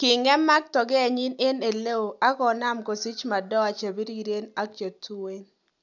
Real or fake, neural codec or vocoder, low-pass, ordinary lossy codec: real; none; 7.2 kHz; none